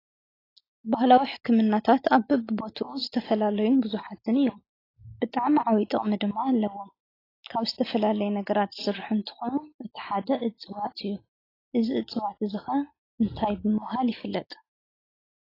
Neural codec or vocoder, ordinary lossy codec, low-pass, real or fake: none; AAC, 24 kbps; 5.4 kHz; real